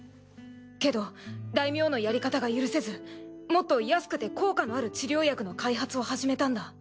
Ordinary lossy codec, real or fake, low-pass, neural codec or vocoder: none; real; none; none